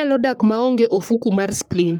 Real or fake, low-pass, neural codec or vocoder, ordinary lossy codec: fake; none; codec, 44.1 kHz, 3.4 kbps, Pupu-Codec; none